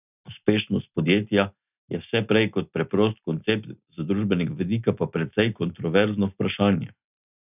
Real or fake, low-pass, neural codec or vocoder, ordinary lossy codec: real; 3.6 kHz; none; none